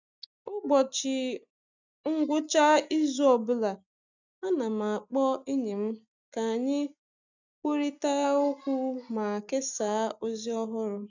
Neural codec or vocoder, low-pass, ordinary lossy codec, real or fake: none; 7.2 kHz; none; real